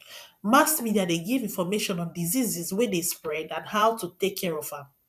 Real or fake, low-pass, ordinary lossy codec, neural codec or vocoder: fake; 14.4 kHz; none; vocoder, 44.1 kHz, 128 mel bands every 512 samples, BigVGAN v2